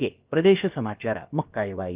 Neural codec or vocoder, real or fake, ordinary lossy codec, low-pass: codec, 16 kHz, about 1 kbps, DyCAST, with the encoder's durations; fake; Opus, 32 kbps; 3.6 kHz